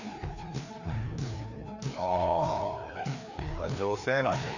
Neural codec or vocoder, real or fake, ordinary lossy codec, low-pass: codec, 16 kHz, 2 kbps, FreqCodec, larger model; fake; none; 7.2 kHz